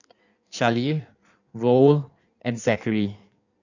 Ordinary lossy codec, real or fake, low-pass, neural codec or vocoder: none; fake; 7.2 kHz; codec, 16 kHz in and 24 kHz out, 1.1 kbps, FireRedTTS-2 codec